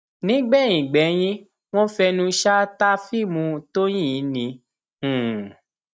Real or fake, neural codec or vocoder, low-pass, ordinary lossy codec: real; none; none; none